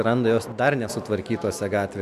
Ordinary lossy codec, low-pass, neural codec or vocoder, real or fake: Opus, 64 kbps; 14.4 kHz; none; real